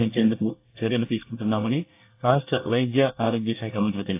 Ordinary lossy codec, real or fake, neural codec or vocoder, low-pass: AAC, 24 kbps; fake; codec, 24 kHz, 1 kbps, SNAC; 3.6 kHz